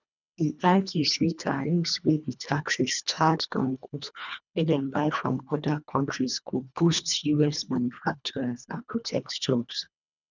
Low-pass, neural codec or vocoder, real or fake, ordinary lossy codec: 7.2 kHz; codec, 24 kHz, 1.5 kbps, HILCodec; fake; none